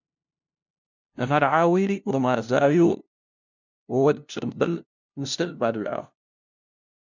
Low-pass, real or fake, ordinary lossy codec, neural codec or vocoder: 7.2 kHz; fake; MP3, 64 kbps; codec, 16 kHz, 0.5 kbps, FunCodec, trained on LibriTTS, 25 frames a second